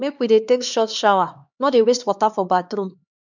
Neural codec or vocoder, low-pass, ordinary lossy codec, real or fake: codec, 16 kHz, 2 kbps, X-Codec, HuBERT features, trained on LibriSpeech; 7.2 kHz; none; fake